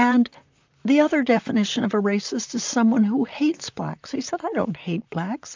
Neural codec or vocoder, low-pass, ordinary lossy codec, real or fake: vocoder, 44.1 kHz, 128 mel bands, Pupu-Vocoder; 7.2 kHz; MP3, 64 kbps; fake